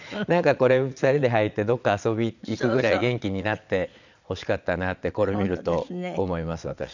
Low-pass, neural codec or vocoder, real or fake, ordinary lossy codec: 7.2 kHz; vocoder, 22.05 kHz, 80 mel bands, Vocos; fake; none